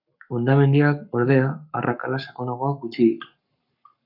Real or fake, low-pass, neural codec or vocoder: fake; 5.4 kHz; codec, 44.1 kHz, 7.8 kbps, Pupu-Codec